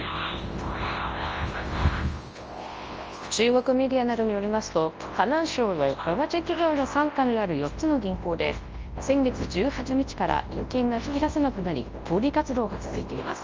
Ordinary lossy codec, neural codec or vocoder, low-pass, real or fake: Opus, 24 kbps; codec, 24 kHz, 0.9 kbps, WavTokenizer, large speech release; 7.2 kHz; fake